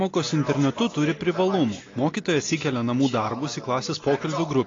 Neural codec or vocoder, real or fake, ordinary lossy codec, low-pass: none; real; AAC, 32 kbps; 7.2 kHz